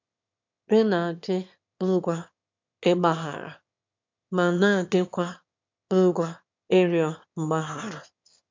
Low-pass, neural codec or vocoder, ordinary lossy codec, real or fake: 7.2 kHz; autoencoder, 22.05 kHz, a latent of 192 numbers a frame, VITS, trained on one speaker; MP3, 64 kbps; fake